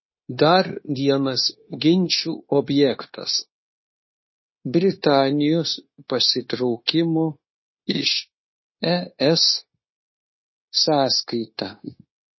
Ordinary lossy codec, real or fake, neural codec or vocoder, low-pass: MP3, 24 kbps; fake; codec, 16 kHz, 0.9 kbps, LongCat-Audio-Codec; 7.2 kHz